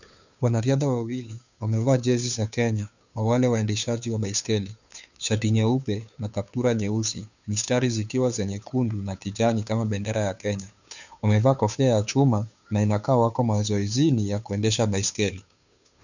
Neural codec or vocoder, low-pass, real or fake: codec, 16 kHz, 2 kbps, FunCodec, trained on Chinese and English, 25 frames a second; 7.2 kHz; fake